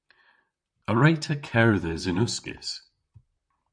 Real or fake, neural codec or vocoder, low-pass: fake; vocoder, 44.1 kHz, 128 mel bands, Pupu-Vocoder; 9.9 kHz